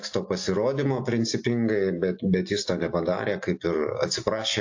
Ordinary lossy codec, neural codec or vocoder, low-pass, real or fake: AAC, 48 kbps; none; 7.2 kHz; real